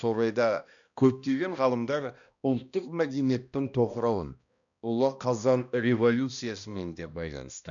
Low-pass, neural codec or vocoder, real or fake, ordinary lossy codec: 7.2 kHz; codec, 16 kHz, 1 kbps, X-Codec, HuBERT features, trained on balanced general audio; fake; none